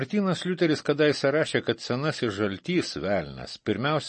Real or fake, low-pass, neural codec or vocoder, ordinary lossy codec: real; 10.8 kHz; none; MP3, 32 kbps